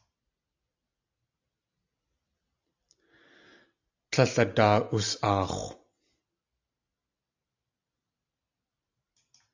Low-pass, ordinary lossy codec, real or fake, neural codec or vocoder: 7.2 kHz; AAC, 48 kbps; real; none